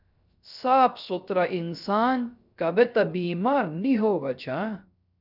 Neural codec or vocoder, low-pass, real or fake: codec, 16 kHz, 0.3 kbps, FocalCodec; 5.4 kHz; fake